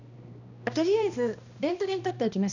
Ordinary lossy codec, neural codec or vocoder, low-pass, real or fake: none; codec, 16 kHz, 1 kbps, X-Codec, HuBERT features, trained on balanced general audio; 7.2 kHz; fake